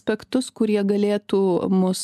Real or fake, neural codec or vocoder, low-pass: real; none; 14.4 kHz